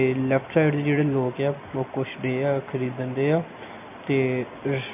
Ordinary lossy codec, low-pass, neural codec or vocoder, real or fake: MP3, 32 kbps; 3.6 kHz; none; real